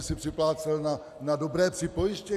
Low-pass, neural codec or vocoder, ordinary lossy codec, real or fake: 14.4 kHz; none; AAC, 96 kbps; real